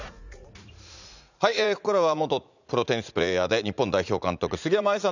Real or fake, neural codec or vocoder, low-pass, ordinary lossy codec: fake; vocoder, 44.1 kHz, 80 mel bands, Vocos; 7.2 kHz; none